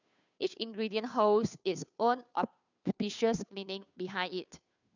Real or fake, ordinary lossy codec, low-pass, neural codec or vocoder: fake; none; 7.2 kHz; codec, 16 kHz, 2 kbps, FunCodec, trained on Chinese and English, 25 frames a second